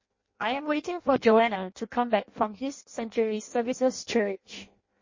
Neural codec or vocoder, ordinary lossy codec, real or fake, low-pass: codec, 16 kHz in and 24 kHz out, 0.6 kbps, FireRedTTS-2 codec; MP3, 32 kbps; fake; 7.2 kHz